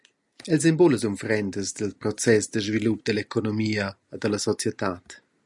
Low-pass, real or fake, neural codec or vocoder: 10.8 kHz; real; none